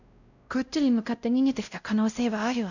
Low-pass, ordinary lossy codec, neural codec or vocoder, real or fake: 7.2 kHz; none; codec, 16 kHz, 0.5 kbps, X-Codec, WavLM features, trained on Multilingual LibriSpeech; fake